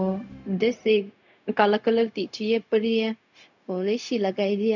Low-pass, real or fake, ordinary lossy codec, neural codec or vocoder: 7.2 kHz; fake; none; codec, 16 kHz, 0.4 kbps, LongCat-Audio-Codec